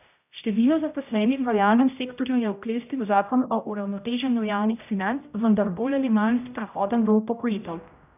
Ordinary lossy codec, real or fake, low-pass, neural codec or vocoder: none; fake; 3.6 kHz; codec, 16 kHz, 0.5 kbps, X-Codec, HuBERT features, trained on general audio